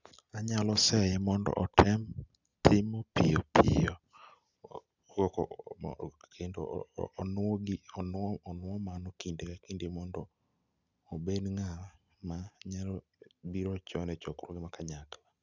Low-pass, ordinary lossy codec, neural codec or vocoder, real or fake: 7.2 kHz; none; none; real